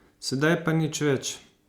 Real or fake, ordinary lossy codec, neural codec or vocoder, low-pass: real; Opus, 64 kbps; none; 19.8 kHz